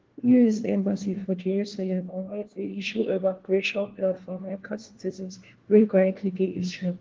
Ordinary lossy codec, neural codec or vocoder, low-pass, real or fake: Opus, 16 kbps; codec, 16 kHz, 1 kbps, FunCodec, trained on LibriTTS, 50 frames a second; 7.2 kHz; fake